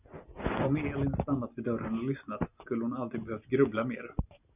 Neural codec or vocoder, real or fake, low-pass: none; real; 3.6 kHz